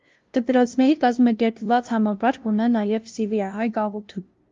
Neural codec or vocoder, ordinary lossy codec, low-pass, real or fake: codec, 16 kHz, 0.5 kbps, FunCodec, trained on LibriTTS, 25 frames a second; Opus, 32 kbps; 7.2 kHz; fake